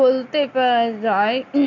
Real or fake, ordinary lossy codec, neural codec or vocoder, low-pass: real; none; none; 7.2 kHz